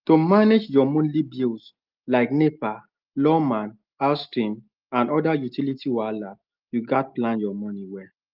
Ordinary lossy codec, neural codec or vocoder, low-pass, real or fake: Opus, 32 kbps; none; 5.4 kHz; real